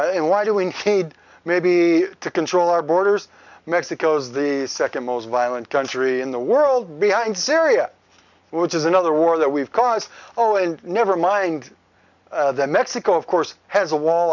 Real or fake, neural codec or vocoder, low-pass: real; none; 7.2 kHz